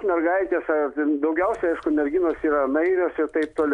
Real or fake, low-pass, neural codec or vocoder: real; 10.8 kHz; none